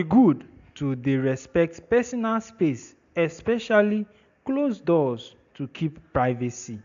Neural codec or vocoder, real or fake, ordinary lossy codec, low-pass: none; real; MP3, 64 kbps; 7.2 kHz